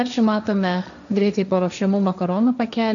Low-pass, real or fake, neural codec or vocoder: 7.2 kHz; fake; codec, 16 kHz, 1.1 kbps, Voila-Tokenizer